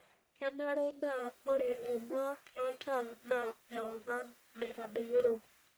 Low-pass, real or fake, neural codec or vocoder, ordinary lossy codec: none; fake; codec, 44.1 kHz, 1.7 kbps, Pupu-Codec; none